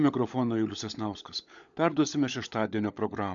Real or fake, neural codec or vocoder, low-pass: fake; codec, 16 kHz, 16 kbps, FunCodec, trained on LibriTTS, 50 frames a second; 7.2 kHz